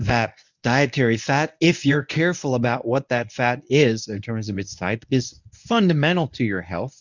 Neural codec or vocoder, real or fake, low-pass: codec, 24 kHz, 0.9 kbps, WavTokenizer, medium speech release version 1; fake; 7.2 kHz